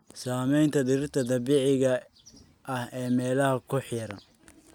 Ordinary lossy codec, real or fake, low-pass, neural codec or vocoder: none; real; 19.8 kHz; none